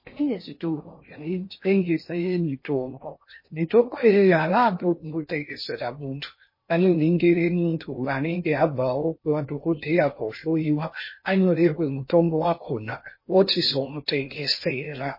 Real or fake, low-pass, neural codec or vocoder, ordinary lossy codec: fake; 5.4 kHz; codec, 16 kHz in and 24 kHz out, 0.6 kbps, FocalCodec, streaming, 4096 codes; MP3, 24 kbps